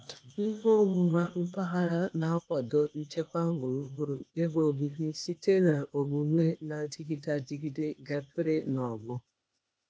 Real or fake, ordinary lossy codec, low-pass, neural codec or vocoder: fake; none; none; codec, 16 kHz, 0.8 kbps, ZipCodec